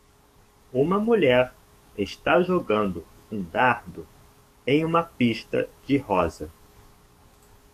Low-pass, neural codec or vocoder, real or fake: 14.4 kHz; codec, 44.1 kHz, 7.8 kbps, DAC; fake